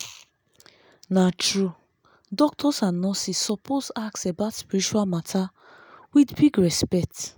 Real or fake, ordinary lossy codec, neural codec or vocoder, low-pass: real; none; none; none